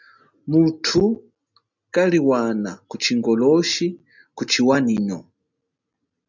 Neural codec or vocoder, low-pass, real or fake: none; 7.2 kHz; real